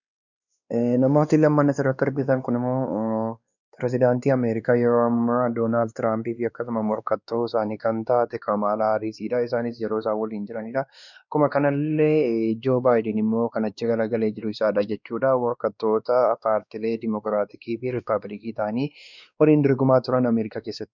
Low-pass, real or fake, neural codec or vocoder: 7.2 kHz; fake; codec, 16 kHz, 2 kbps, X-Codec, WavLM features, trained on Multilingual LibriSpeech